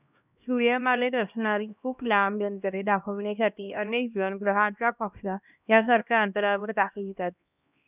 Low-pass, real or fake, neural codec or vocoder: 3.6 kHz; fake; codec, 16 kHz, 1 kbps, X-Codec, HuBERT features, trained on LibriSpeech